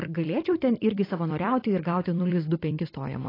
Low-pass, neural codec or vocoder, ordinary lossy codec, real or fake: 5.4 kHz; vocoder, 44.1 kHz, 128 mel bands every 256 samples, BigVGAN v2; AAC, 24 kbps; fake